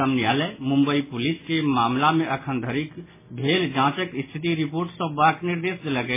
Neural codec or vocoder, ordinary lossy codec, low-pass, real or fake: none; MP3, 16 kbps; 3.6 kHz; real